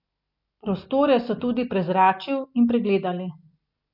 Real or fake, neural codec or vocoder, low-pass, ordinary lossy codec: real; none; 5.4 kHz; none